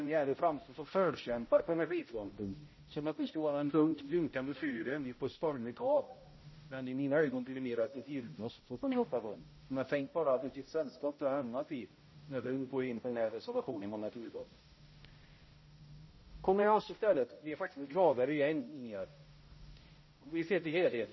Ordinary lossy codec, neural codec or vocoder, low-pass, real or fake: MP3, 24 kbps; codec, 16 kHz, 0.5 kbps, X-Codec, HuBERT features, trained on balanced general audio; 7.2 kHz; fake